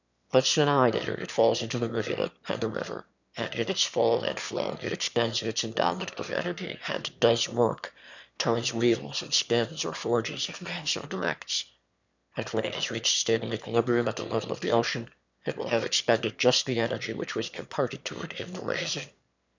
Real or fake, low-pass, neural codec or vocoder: fake; 7.2 kHz; autoencoder, 22.05 kHz, a latent of 192 numbers a frame, VITS, trained on one speaker